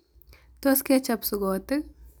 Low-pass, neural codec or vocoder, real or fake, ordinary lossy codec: none; none; real; none